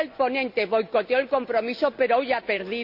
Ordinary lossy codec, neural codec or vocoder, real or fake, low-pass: none; none; real; 5.4 kHz